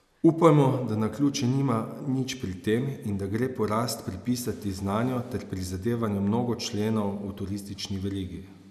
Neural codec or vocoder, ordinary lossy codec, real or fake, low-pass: none; none; real; 14.4 kHz